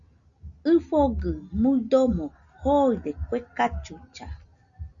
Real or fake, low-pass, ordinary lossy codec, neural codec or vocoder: real; 7.2 kHz; Opus, 64 kbps; none